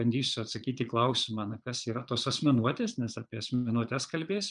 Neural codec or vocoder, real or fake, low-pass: vocoder, 22.05 kHz, 80 mel bands, Vocos; fake; 9.9 kHz